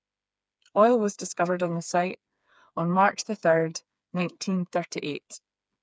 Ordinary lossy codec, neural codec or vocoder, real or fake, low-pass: none; codec, 16 kHz, 4 kbps, FreqCodec, smaller model; fake; none